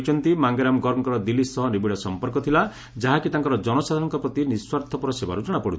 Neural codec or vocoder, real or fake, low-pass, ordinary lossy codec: none; real; none; none